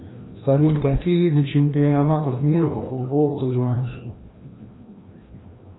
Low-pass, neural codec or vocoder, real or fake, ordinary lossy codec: 7.2 kHz; codec, 16 kHz, 1 kbps, FreqCodec, larger model; fake; AAC, 16 kbps